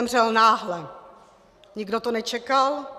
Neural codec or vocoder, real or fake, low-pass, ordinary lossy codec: none; real; 14.4 kHz; Opus, 64 kbps